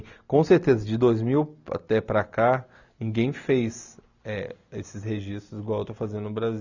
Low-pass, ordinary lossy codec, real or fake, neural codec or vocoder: 7.2 kHz; none; real; none